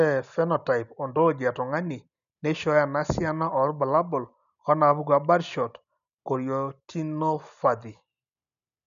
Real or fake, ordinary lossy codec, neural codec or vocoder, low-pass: real; none; none; 7.2 kHz